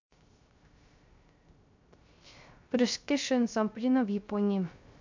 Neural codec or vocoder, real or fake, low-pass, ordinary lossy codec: codec, 16 kHz, 0.3 kbps, FocalCodec; fake; 7.2 kHz; MP3, 64 kbps